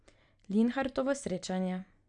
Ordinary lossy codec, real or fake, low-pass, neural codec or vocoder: none; real; 9.9 kHz; none